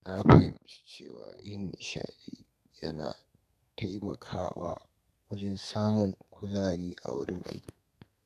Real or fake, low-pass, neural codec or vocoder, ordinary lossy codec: fake; 14.4 kHz; codec, 32 kHz, 1.9 kbps, SNAC; none